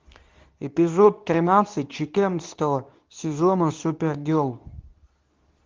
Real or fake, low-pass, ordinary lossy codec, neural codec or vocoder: fake; 7.2 kHz; Opus, 32 kbps; codec, 24 kHz, 0.9 kbps, WavTokenizer, medium speech release version 2